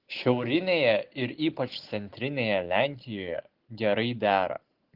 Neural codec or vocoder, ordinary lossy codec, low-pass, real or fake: none; Opus, 16 kbps; 5.4 kHz; real